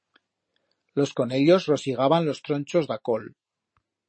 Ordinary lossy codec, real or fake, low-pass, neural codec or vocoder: MP3, 32 kbps; real; 9.9 kHz; none